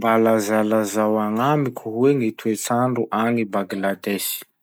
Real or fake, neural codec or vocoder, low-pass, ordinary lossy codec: real; none; none; none